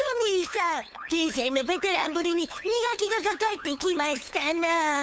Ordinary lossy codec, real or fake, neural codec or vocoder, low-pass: none; fake; codec, 16 kHz, 2 kbps, FunCodec, trained on LibriTTS, 25 frames a second; none